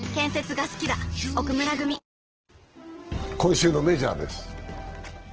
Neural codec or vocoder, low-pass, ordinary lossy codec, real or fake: none; 7.2 kHz; Opus, 16 kbps; real